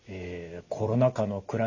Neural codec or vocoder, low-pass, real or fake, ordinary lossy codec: none; 7.2 kHz; real; none